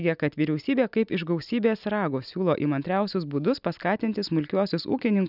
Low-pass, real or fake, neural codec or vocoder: 5.4 kHz; real; none